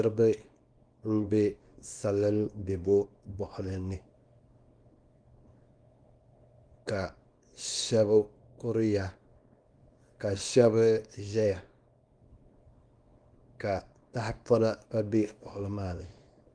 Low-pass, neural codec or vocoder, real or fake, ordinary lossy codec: 9.9 kHz; codec, 24 kHz, 0.9 kbps, WavTokenizer, small release; fake; Opus, 32 kbps